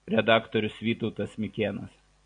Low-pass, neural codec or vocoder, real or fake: 9.9 kHz; none; real